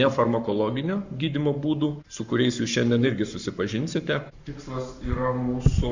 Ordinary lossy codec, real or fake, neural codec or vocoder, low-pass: Opus, 64 kbps; fake; codec, 44.1 kHz, 7.8 kbps, Pupu-Codec; 7.2 kHz